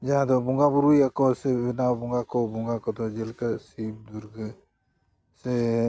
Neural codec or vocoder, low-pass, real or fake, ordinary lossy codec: none; none; real; none